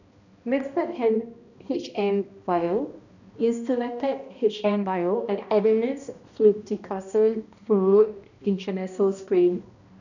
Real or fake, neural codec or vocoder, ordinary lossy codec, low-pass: fake; codec, 16 kHz, 1 kbps, X-Codec, HuBERT features, trained on balanced general audio; none; 7.2 kHz